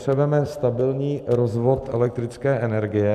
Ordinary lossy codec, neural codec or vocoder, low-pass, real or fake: MP3, 96 kbps; vocoder, 44.1 kHz, 128 mel bands every 256 samples, BigVGAN v2; 14.4 kHz; fake